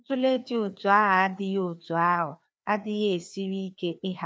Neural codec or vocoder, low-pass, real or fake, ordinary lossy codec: codec, 16 kHz, 2 kbps, FreqCodec, larger model; none; fake; none